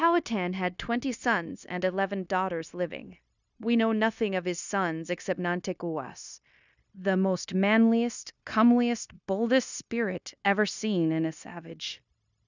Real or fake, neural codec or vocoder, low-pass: fake; codec, 16 kHz, 0.9 kbps, LongCat-Audio-Codec; 7.2 kHz